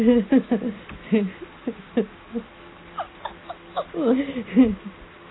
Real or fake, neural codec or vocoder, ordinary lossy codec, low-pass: real; none; AAC, 16 kbps; 7.2 kHz